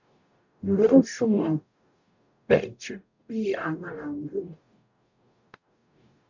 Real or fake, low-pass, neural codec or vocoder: fake; 7.2 kHz; codec, 44.1 kHz, 0.9 kbps, DAC